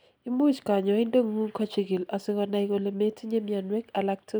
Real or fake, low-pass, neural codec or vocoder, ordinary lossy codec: real; none; none; none